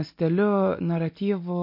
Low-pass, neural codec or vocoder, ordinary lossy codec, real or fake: 5.4 kHz; none; MP3, 32 kbps; real